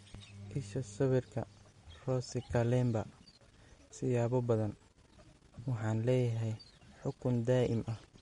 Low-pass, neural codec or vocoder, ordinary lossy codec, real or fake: 19.8 kHz; none; MP3, 48 kbps; real